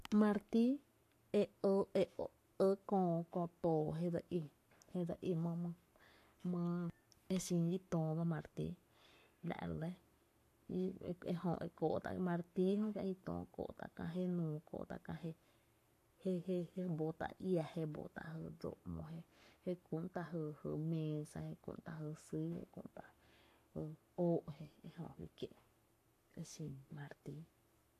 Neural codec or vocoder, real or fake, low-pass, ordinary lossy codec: codec, 44.1 kHz, 7.8 kbps, Pupu-Codec; fake; 14.4 kHz; AAC, 64 kbps